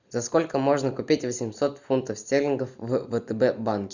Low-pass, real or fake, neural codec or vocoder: 7.2 kHz; real; none